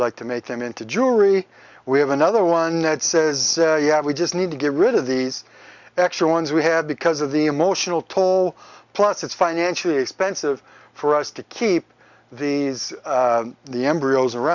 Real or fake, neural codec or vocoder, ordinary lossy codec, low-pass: real; none; Opus, 64 kbps; 7.2 kHz